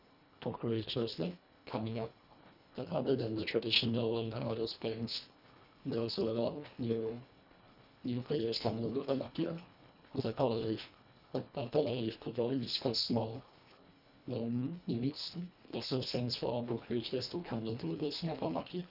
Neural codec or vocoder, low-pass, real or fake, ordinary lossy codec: codec, 24 kHz, 1.5 kbps, HILCodec; 5.4 kHz; fake; none